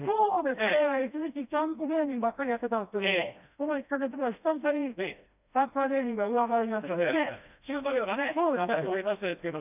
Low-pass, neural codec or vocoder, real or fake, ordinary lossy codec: 3.6 kHz; codec, 16 kHz, 1 kbps, FreqCodec, smaller model; fake; none